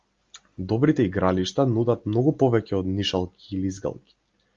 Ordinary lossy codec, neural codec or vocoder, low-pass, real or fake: Opus, 32 kbps; none; 7.2 kHz; real